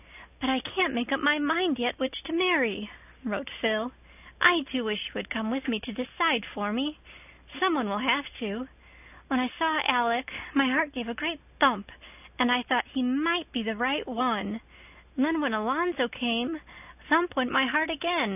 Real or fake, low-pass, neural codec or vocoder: real; 3.6 kHz; none